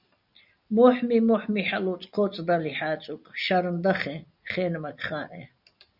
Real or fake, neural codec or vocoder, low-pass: real; none; 5.4 kHz